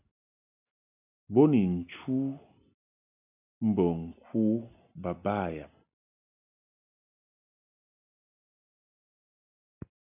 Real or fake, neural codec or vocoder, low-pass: real; none; 3.6 kHz